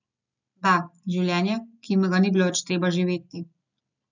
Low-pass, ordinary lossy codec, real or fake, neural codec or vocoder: 7.2 kHz; none; real; none